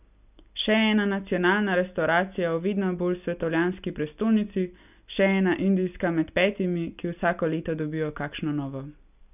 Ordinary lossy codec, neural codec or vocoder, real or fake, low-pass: none; none; real; 3.6 kHz